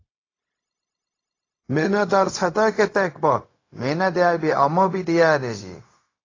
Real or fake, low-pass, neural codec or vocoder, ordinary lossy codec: fake; 7.2 kHz; codec, 16 kHz, 0.4 kbps, LongCat-Audio-Codec; AAC, 32 kbps